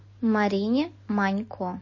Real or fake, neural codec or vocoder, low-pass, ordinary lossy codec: real; none; 7.2 kHz; MP3, 32 kbps